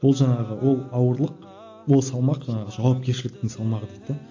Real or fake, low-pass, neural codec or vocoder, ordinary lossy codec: real; 7.2 kHz; none; AAC, 48 kbps